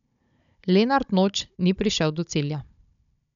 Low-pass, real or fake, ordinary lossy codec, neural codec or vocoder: 7.2 kHz; fake; none; codec, 16 kHz, 16 kbps, FunCodec, trained on Chinese and English, 50 frames a second